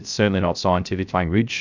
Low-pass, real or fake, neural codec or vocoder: 7.2 kHz; fake; codec, 16 kHz, 0.3 kbps, FocalCodec